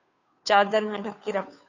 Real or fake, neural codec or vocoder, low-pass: fake; codec, 16 kHz, 2 kbps, FunCodec, trained on Chinese and English, 25 frames a second; 7.2 kHz